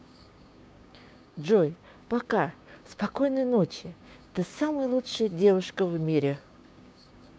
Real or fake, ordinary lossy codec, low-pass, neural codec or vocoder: fake; none; none; codec, 16 kHz, 6 kbps, DAC